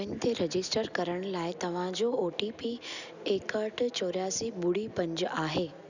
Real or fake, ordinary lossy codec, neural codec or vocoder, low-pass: real; none; none; 7.2 kHz